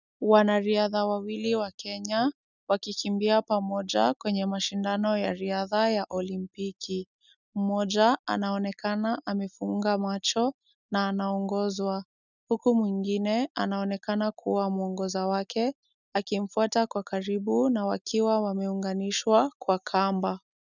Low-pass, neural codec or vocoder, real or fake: 7.2 kHz; none; real